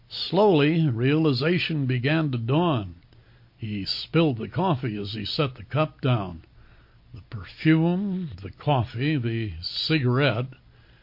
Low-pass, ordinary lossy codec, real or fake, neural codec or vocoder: 5.4 kHz; MP3, 32 kbps; real; none